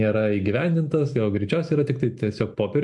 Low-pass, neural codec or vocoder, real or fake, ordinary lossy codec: 10.8 kHz; none; real; MP3, 64 kbps